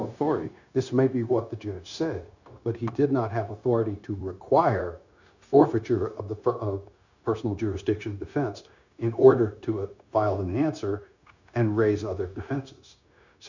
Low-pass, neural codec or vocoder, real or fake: 7.2 kHz; codec, 16 kHz, 0.9 kbps, LongCat-Audio-Codec; fake